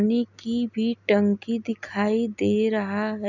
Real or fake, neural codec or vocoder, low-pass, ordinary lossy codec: real; none; 7.2 kHz; none